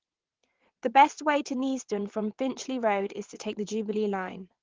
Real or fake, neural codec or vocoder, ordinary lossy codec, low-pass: fake; vocoder, 22.05 kHz, 80 mel bands, WaveNeXt; Opus, 16 kbps; 7.2 kHz